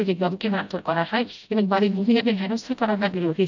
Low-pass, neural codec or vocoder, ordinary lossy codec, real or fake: 7.2 kHz; codec, 16 kHz, 0.5 kbps, FreqCodec, smaller model; none; fake